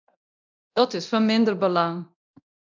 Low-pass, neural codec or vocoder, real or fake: 7.2 kHz; codec, 24 kHz, 0.9 kbps, DualCodec; fake